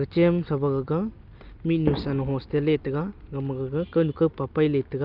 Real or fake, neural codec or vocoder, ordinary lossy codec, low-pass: real; none; Opus, 32 kbps; 5.4 kHz